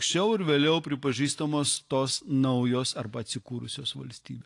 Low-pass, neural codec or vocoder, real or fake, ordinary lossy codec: 10.8 kHz; none; real; AAC, 48 kbps